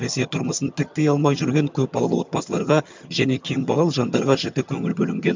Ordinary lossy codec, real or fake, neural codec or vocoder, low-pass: MP3, 64 kbps; fake; vocoder, 22.05 kHz, 80 mel bands, HiFi-GAN; 7.2 kHz